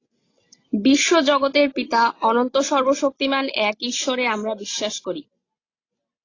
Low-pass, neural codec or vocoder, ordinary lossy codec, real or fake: 7.2 kHz; none; AAC, 32 kbps; real